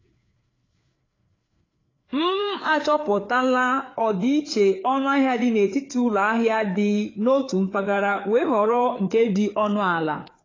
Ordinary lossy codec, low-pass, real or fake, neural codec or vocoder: AAC, 32 kbps; 7.2 kHz; fake; codec, 16 kHz, 4 kbps, FreqCodec, larger model